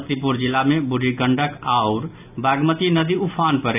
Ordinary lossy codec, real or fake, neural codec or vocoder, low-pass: none; real; none; 3.6 kHz